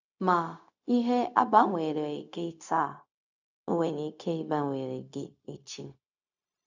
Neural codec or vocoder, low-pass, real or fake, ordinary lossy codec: codec, 16 kHz, 0.4 kbps, LongCat-Audio-Codec; 7.2 kHz; fake; none